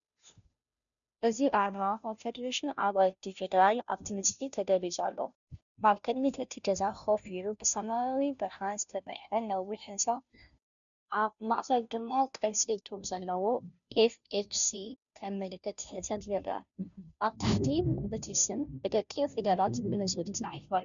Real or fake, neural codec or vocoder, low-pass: fake; codec, 16 kHz, 0.5 kbps, FunCodec, trained on Chinese and English, 25 frames a second; 7.2 kHz